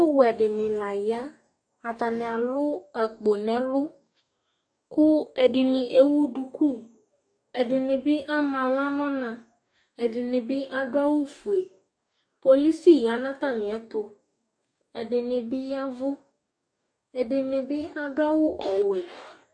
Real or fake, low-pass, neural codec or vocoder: fake; 9.9 kHz; codec, 44.1 kHz, 2.6 kbps, DAC